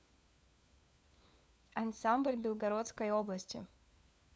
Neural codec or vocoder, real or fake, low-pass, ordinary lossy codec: codec, 16 kHz, 4 kbps, FunCodec, trained on LibriTTS, 50 frames a second; fake; none; none